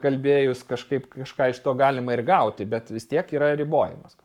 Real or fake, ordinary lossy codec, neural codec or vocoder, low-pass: fake; MP3, 96 kbps; codec, 44.1 kHz, 7.8 kbps, Pupu-Codec; 19.8 kHz